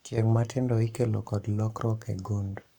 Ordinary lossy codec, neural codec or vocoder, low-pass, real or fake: none; codec, 44.1 kHz, 7.8 kbps, Pupu-Codec; 19.8 kHz; fake